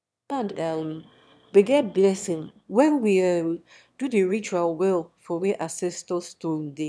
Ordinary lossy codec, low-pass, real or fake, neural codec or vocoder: none; none; fake; autoencoder, 22.05 kHz, a latent of 192 numbers a frame, VITS, trained on one speaker